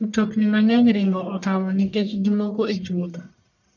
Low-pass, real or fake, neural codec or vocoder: 7.2 kHz; fake; codec, 44.1 kHz, 1.7 kbps, Pupu-Codec